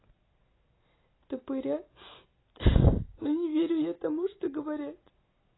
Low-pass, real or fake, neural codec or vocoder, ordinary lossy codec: 7.2 kHz; real; none; AAC, 16 kbps